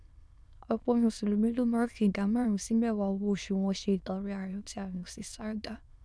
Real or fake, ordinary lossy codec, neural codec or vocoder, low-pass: fake; none; autoencoder, 22.05 kHz, a latent of 192 numbers a frame, VITS, trained on many speakers; none